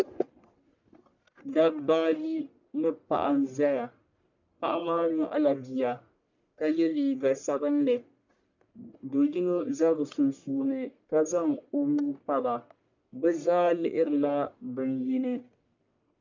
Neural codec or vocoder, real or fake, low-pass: codec, 44.1 kHz, 1.7 kbps, Pupu-Codec; fake; 7.2 kHz